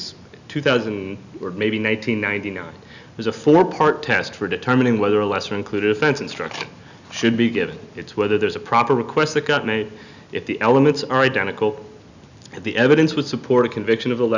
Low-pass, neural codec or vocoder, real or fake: 7.2 kHz; none; real